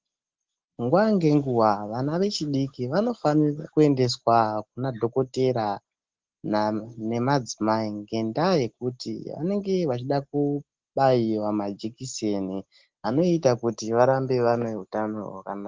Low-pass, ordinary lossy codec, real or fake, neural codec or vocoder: 7.2 kHz; Opus, 16 kbps; real; none